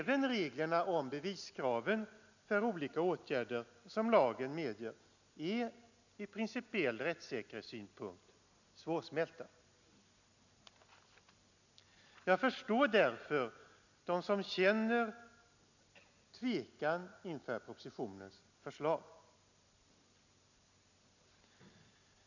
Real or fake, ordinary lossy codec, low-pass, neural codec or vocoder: real; none; 7.2 kHz; none